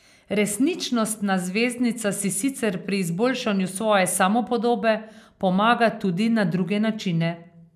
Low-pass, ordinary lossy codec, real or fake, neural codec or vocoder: 14.4 kHz; none; real; none